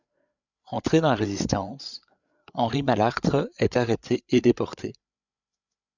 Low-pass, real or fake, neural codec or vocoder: 7.2 kHz; fake; codec, 16 kHz, 8 kbps, FreqCodec, larger model